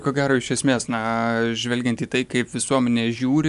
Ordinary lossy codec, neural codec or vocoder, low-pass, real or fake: MP3, 96 kbps; none; 10.8 kHz; real